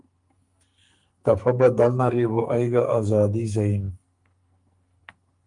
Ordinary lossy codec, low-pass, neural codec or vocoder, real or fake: Opus, 32 kbps; 10.8 kHz; codec, 44.1 kHz, 2.6 kbps, SNAC; fake